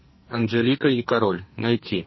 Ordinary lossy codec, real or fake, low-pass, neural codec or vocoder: MP3, 24 kbps; fake; 7.2 kHz; codec, 44.1 kHz, 2.6 kbps, SNAC